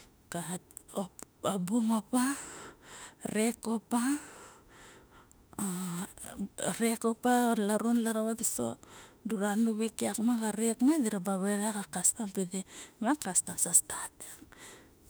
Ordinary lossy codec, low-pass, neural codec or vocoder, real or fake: none; none; autoencoder, 48 kHz, 32 numbers a frame, DAC-VAE, trained on Japanese speech; fake